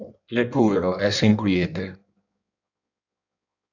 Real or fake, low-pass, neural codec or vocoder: fake; 7.2 kHz; codec, 16 kHz in and 24 kHz out, 1.1 kbps, FireRedTTS-2 codec